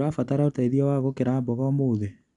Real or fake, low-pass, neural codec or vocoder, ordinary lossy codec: real; 10.8 kHz; none; none